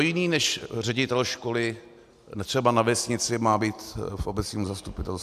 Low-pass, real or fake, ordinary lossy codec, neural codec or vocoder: 14.4 kHz; real; AAC, 96 kbps; none